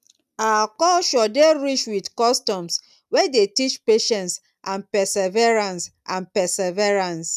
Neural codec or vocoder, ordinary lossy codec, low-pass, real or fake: none; none; 14.4 kHz; real